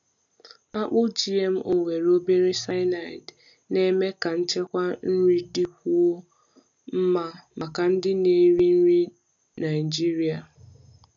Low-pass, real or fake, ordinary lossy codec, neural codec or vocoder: 7.2 kHz; real; AAC, 64 kbps; none